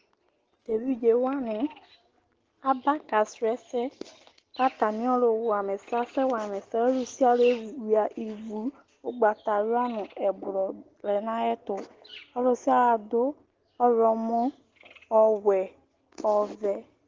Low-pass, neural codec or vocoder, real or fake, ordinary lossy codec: 7.2 kHz; none; real; Opus, 16 kbps